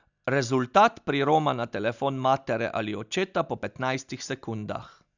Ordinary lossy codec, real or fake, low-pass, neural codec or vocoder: none; real; 7.2 kHz; none